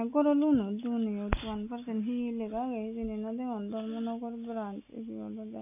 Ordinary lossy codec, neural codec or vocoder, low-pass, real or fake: none; none; 3.6 kHz; real